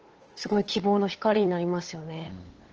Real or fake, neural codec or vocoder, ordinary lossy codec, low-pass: fake; codec, 16 kHz, 8 kbps, FunCodec, trained on LibriTTS, 25 frames a second; Opus, 16 kbps; 7.2 kHz